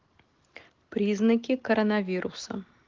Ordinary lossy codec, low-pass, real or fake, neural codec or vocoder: Opus, 16 kbps; 7.2 kHz; real; none